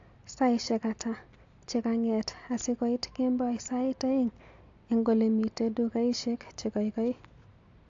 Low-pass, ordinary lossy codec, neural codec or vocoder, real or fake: 7.2 kHz; AAC, 48 kbps; none; real